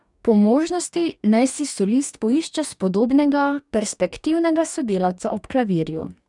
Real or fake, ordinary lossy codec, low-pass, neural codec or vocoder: fake; none; 10.8 kHz; codec, 44.1 kHz, 2.6 kbps, DAC